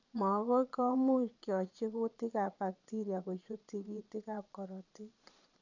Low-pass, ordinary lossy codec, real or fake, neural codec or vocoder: 7.2 kHz; none; fake; vocoder, 22.05 kHz, 80 mel bands, Vocos